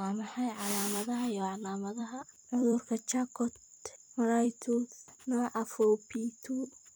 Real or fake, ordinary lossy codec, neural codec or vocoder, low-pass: fake; none; vocoder, 44.1 kHz, 128 mel bands every 256 samples, BigVGAN v2; none